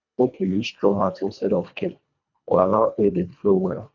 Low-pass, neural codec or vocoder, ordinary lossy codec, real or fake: 7.2 kHz; codec, 24 kHz, 1.5 kbps, HILCodec; none; fake